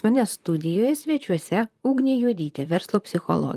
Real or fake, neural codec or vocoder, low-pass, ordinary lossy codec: fake; vocoder, 44.1 kHz, 128 mel bands, Pupu-Vocoder; 14.4 kHz; Opus, 32 kbps